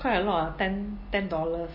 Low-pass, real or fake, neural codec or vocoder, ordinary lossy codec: 5.4 kHz; real; none; MP3, 24 kbps